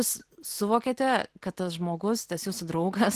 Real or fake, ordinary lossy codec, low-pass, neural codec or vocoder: real; Opus, 16 kbps; 14.4 kHz; none